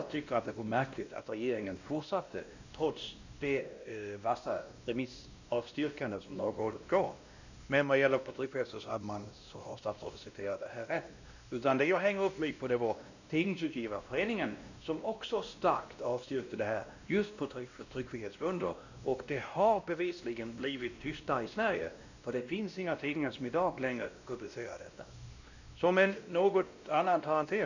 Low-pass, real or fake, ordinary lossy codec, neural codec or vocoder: 7.2 kHz; fake; none; codec, 16 kHz, 1 kbps, X-Codec, WavLM features, trained on Multilingual LibriSpeech